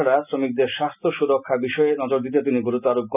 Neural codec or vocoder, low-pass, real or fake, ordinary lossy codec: none; 3.6 kHz; real; none